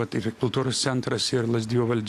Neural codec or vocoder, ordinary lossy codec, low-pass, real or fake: none; AAC, 64 kbps; 14.4 kHz; real